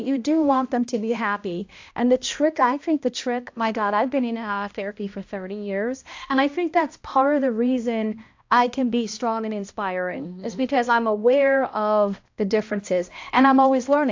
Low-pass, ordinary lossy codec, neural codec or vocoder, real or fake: 7.2 kHz; AAC, 48 kbps; codec, 16 kHz, 1 kbps, X-Codec, HuBERT features, trained on balanced general audio; fake